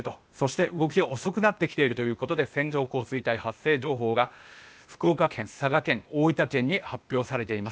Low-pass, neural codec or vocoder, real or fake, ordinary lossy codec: none; codec, 16 kHz, 0.8 kbps, ZipCodec; fake; none